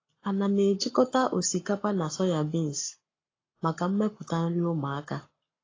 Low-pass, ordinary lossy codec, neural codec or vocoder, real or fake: 7.2 kHz; AAC, 32 kbps; codec, 44.1 kHz, 7.8 kbps, Pupu-Codec; fake